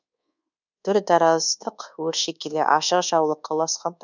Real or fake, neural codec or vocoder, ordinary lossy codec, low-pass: fake; codec, 24 kHz, 1.2 kbps, DualCodec; none; 7.2 kHz